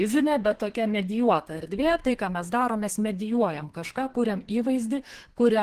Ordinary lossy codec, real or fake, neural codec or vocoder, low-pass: Opus, 16 kbps; fake; codec, 32 kHz, 1.9 kbps, SNAC; 14.4 kHz